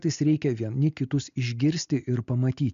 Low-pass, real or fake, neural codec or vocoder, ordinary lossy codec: 7.2 kHz; real; none; MP3, 64 kbps